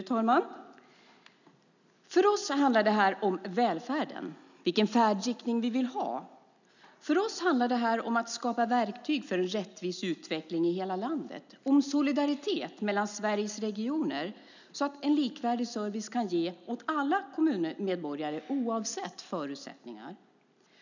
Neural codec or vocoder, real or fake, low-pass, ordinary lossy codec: none; real; 7.2 kHz; none